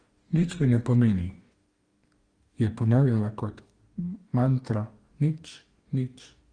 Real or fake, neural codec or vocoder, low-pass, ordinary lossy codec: fake; codec, 44.1 kHz, 2.6 kbps, DAC; 9.9 kHz; Opus, 24 kbps